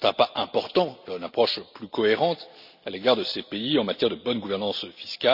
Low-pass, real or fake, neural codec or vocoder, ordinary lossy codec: 5.4 kHz; real; none; none